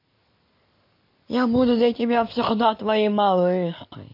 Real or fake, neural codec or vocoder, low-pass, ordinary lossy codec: real; none; 5.4 kHz; MP3, 32 kbps